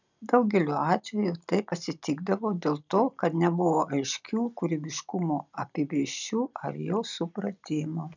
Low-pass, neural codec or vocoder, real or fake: 7.2 kHz; none; real